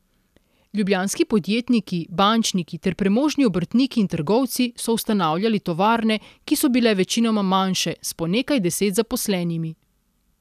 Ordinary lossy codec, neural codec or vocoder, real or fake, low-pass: none; none; real; 14.4 kHz